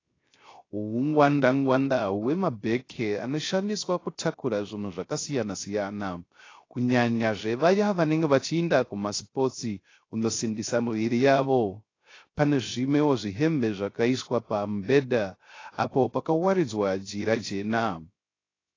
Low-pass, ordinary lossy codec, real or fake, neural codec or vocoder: 7.2 kHz; AAC, 32 kbps; fake; codec, 16 kHz, 0.3 kbps, FocalCodec